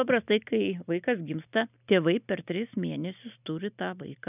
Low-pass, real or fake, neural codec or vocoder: 3.6 kHz; real; none